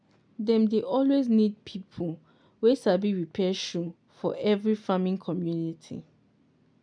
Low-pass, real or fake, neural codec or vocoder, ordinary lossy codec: 9.9 kHz; real; none; none